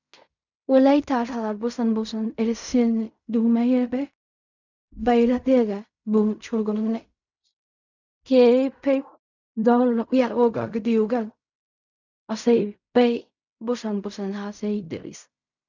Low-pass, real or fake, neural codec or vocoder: 7.2 kHz; fake; codec, 16 kHz in and 24 kHz out, 0.4 kbps, LongCat-Audio-Codec, fine tuned four codebook decoder